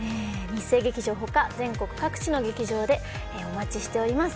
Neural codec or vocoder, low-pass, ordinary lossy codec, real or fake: none; none; none; real